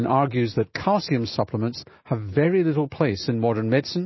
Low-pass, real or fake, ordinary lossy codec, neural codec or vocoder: 7.2 kHz; real; MP3, 24 kbps; none